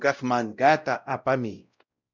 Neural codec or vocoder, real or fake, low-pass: codec, 16 kHz, 0.5 kbps, X-Codec, WavLM features, trained on Multilingual LibriSpeech; fake; 7.2 kHz